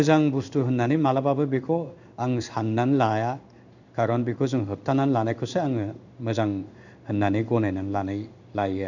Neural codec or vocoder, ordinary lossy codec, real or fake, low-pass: codec, 16 kHz in and 24 kHz out, 1 kbps, XY-Tokenizer; none; fake; 7.2 kHz